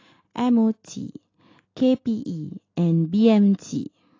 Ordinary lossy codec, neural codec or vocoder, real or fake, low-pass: AAC, 32 kbps; none; real; 7.2 kHz